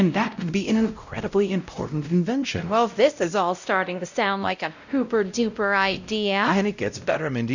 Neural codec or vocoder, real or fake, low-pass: codec, 16 kHz, 0.5 kbps, X-Codec, WavLM features, trained on Multilingual LibriSpeech; fake; 7.2 kHz